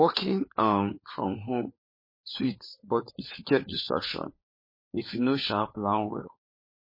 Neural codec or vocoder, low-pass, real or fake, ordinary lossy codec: codec, 16 kHz, 4 kbps, FunCodec, trained on LibriTTS, 50 frames a second; 5.4 kHz; fake; MP3, 24 kbps